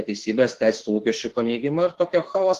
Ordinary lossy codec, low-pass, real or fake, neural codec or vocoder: Opus, 16 kbps; 14.4 kHz; fake; autoencoder, 48 kHz, 32 numbers a frame, DAC-VAE, trained on Japanese speech